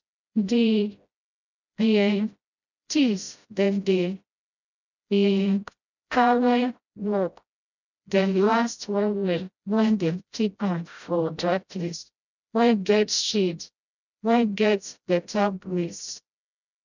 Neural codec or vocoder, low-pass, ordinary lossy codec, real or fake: codec, 16 kHz, 0.5 kbps, FreqCodec, smaller model; 7.2 kHz; none; fake